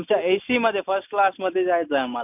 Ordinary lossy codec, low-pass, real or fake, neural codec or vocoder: none; 3.6 kHz; real; none